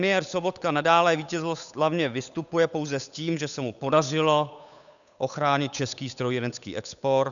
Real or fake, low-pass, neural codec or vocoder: fake; 7.2 kHz; codec, 16 kHz, 8 kbps, FunCodec, trained on Chinese and English, 25 frames a second